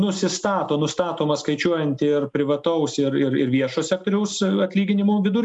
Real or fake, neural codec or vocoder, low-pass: real; none; 10.8 kHz